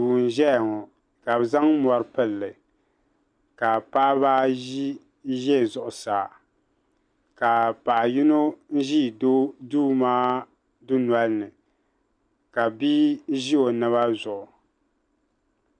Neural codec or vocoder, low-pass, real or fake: none; 9.9 kHz; real